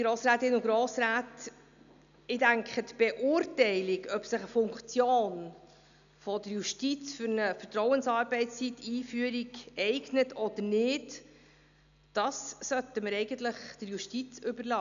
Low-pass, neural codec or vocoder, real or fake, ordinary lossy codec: 7.2 kHz; none; real; none